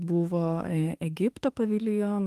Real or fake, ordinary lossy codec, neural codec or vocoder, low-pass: fake; Opus, 24 kbps; autoencoder, 48 kHz, 32 numbers a frame, DAC-VAE, trained on Japanese speech; 14.4 kHz